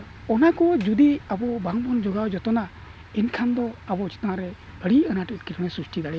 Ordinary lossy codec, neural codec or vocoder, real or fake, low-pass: none; none; real; none